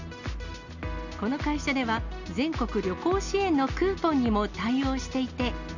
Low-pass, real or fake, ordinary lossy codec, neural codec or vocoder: 7.2 kHz; real; none; none